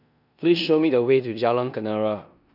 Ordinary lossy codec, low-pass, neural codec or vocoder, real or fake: none; 5.4 kHz; codec, 16 kHz in and 24 kHz out, 0.9 kbps, LongCat-Audio-Codec, four codebook decoder; fake